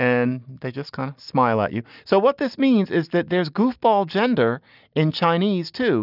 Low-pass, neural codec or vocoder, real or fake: 5.4 kHz; none; real